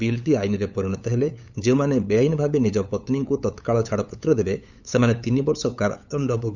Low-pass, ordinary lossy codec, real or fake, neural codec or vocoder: 7.2 kHz; none; fake; codec, 16 kHz, 8 kbps, FunCodec, trained on LibriTTS, 25 frames a second